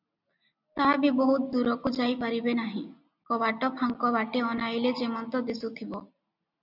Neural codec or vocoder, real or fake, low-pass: none; real; 5.4 kHz